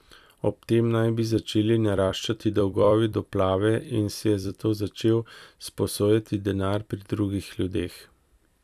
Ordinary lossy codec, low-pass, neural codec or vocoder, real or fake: none; 14.4 kHz; vocoder, 44.1 kHz, 128 mel bands every 256 samples, BigVGAN v2; fake